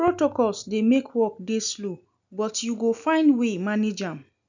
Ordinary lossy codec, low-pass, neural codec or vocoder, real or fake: none; 7.2 kHz; none; real